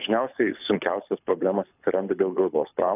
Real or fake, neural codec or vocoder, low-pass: fake; codec, 24 kHz, 6 kbps, HILCodec; 3.6 kHz